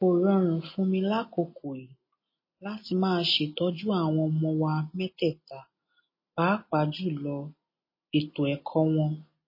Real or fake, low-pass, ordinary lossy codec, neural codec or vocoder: real; 5.4 kHz; MP3, 24 kbps; none